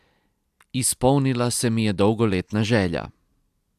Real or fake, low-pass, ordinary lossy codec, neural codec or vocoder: real; 14.4 kHz; AAC, 96 kbps; none